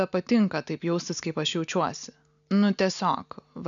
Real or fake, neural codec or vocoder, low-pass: real; none; 7.2 kHz